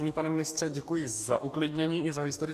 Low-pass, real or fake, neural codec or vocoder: 14.4 kHz; fake; codec, 44.1 kHz, 2.6 kbps, DAC